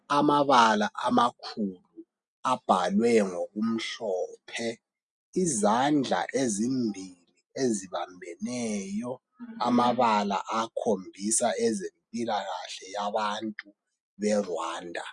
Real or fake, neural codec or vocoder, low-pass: real; none; 10.8 kHz